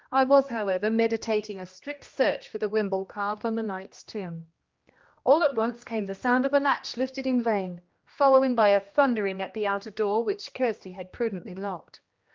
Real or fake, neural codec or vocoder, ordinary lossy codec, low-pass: fake; codec, 16 kHz, 1 kbps, X-Codec, HuBERT features, trained on general audio; Opus, 32 kbps; 7.2 kHz